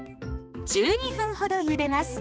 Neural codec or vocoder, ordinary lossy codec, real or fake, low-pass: codec, 16 kHz, 2 kbps, X-Codec, HuBERT features, trained on balanced general audio; none; fake; none